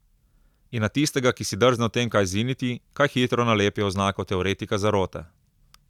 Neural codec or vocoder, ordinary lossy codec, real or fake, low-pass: none; none; real; 19.8 kHz